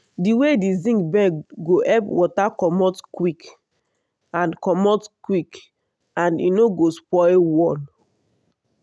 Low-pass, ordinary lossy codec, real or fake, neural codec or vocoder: none; none; real; none